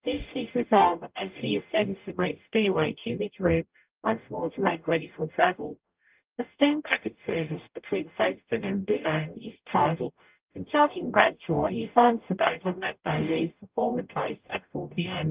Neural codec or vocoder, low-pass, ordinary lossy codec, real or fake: codec, 44.1 kHz, 0.9 kbps, DAC; 3.6 kHz; Opus, 24 kbps; fake